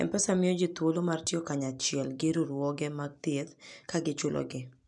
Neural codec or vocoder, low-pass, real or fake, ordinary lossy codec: none; 10.8 kHz; real; none